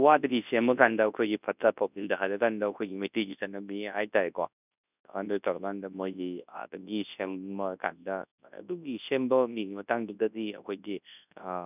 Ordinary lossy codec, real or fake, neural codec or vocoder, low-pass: none; fake; codec, 24 kHz, 0.9 kbps, WavTokenizer, large speech release; 3.6 kHz